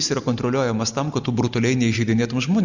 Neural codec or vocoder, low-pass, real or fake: none; 7.2 kHz; real